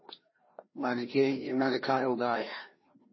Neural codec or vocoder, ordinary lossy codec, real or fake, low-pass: codec, 16 kHz, 1 kbps, FreqCodec, larger model; MP3, 24 kbps; fake; 7.2 kHz